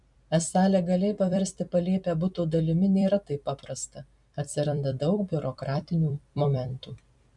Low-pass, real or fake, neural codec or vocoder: 10.8 kHz; fake; vocoder, 44.1 kHz, 128 mel bands every 256 samples, BigVGAN v2